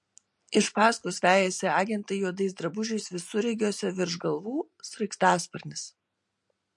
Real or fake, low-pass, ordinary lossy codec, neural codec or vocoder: real; 10.8 kHz; MP3, 48 kbps; none